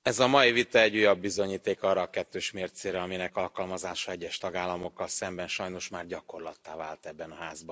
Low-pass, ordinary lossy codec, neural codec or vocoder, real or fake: none; none; none; real